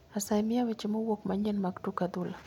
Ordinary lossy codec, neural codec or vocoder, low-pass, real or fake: none; none; 19.8 kHz; real